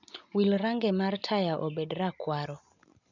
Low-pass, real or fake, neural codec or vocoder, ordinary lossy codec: 7.2 kHz; real; none; none